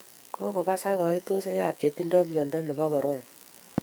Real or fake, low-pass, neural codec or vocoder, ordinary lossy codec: fake; none; codec, 44.1 kHz, 2.6 kbps, SNAC; none